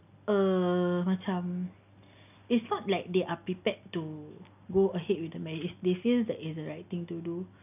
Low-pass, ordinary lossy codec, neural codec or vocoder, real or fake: 3.6 kHz; none; none; real